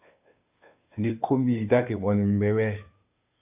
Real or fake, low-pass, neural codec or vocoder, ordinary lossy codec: fake; 3.6 kHz; codec, 16 kHz, 2 kbps, FunCodec, trained on Chinese and English, 25 frames a second; AAC, 32 kbps